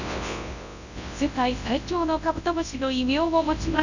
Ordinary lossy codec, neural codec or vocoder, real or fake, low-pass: none; codec, 24 kHz, 0.9 kbps, WavTokenizer, large speech release; fake; 7.2 kHz